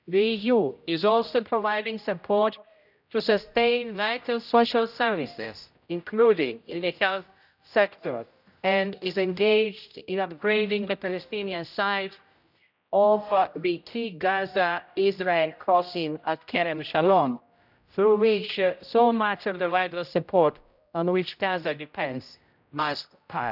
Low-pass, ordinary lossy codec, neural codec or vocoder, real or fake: 5.4 kHz; none; codec, 16 kHz, 0.5 kbps, X-Codec, HuBERT features, trained on general audio; fake